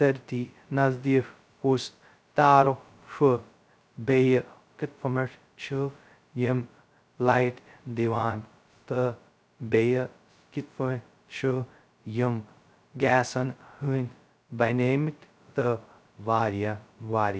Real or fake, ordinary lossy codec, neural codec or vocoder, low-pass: fake; none; codec, 16 kHz, 0.2 kbps, FocalCodec; none